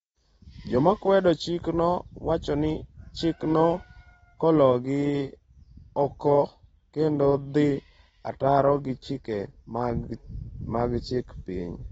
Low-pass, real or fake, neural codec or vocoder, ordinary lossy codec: 19.8 kHz; real; none; AAC, 24 kbps